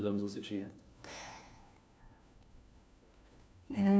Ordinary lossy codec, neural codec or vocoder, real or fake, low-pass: none; codec, 16 kHz, 1 kbps, FunCodec, trained on LibriTTS, 50 frames a second; fake; none